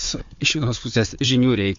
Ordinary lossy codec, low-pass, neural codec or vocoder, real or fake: MP3, 48 kbps; 7.2 kHz; codec, 16 kHz, 4 kbps, FunCodec, trained on Chinese and English, 50 frames a second; fake